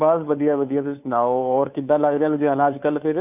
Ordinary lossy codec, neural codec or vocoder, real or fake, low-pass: none; codec, 16 kHz, 2 kbps, FunCodec, trained on Chinese and English, 25 frames a second; fake; 3.6 kHz